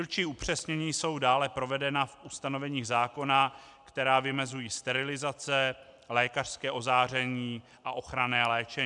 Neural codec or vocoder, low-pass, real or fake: none; 10.8 kHz; real